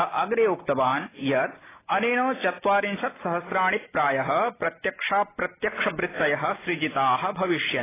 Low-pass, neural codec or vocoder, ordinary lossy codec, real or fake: 3.6 kHz; none; AAC, 16 kbps; real